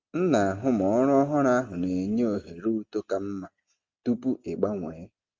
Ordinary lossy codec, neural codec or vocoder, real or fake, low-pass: Opus, 32 kbps; none; real; 7.2 kHz